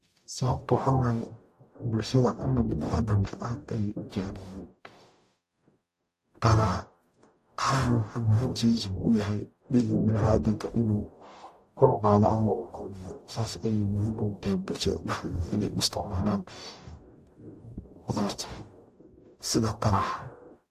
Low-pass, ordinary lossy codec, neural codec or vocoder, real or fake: 14.4 kHz; AAC, 64 kbps; codec, 44.1 kHz, 0.9 kbps, DAC; fake